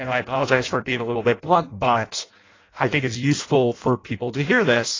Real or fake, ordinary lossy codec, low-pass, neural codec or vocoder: fake; AAC, 32 kbps; 7.2 kHz; codec, 16 kHz in and 24 kHz out, 0.6 kbps, FireRedTTS-2 codec